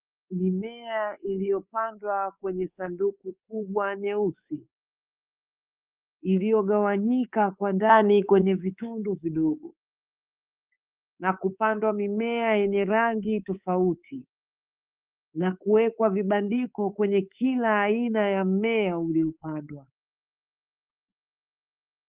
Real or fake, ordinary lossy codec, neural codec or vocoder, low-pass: fake; Opus, 32 kbps; codec, 24 kHz, 3.1 kbps, DualCodec; 3.6 kHz